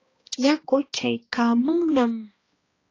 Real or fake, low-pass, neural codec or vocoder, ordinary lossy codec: fake; 7.2 kHz; codec, 16 kHz, 1 kbps, X-Codec, HuBERT features, trained on balanced general audio; AAC, 32 kbps